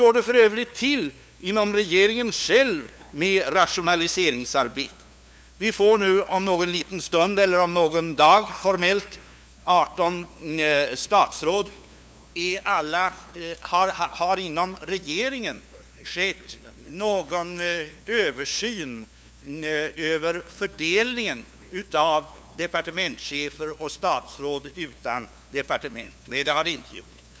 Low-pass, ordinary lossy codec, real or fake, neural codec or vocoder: none; none; fake; codec, 16 kHz, 2 kbps, FunCodec, trained on LibriTTS, 25 frames a second